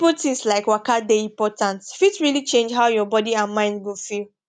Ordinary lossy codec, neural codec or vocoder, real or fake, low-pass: none; none; real; none